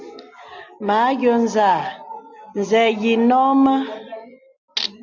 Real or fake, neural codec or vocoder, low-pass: real; none; 7.2 kHz